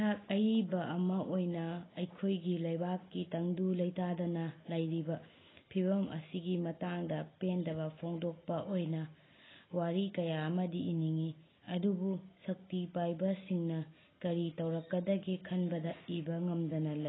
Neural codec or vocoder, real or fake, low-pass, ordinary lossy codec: none; real; 7.2 kHz; AAC, 16 kbps